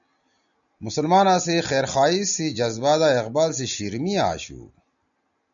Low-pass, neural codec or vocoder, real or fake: 7.2 kHz; none; real